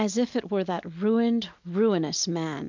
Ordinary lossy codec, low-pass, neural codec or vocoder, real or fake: MP3, 64 kbps; 7.2 kHz; none; real